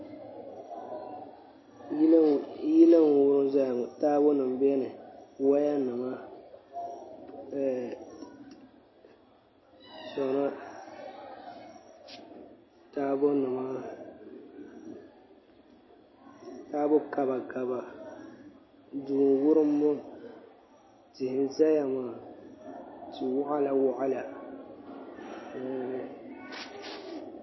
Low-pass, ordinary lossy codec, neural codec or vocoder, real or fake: 7.2 kHz; MP3, 24 kbps; none; real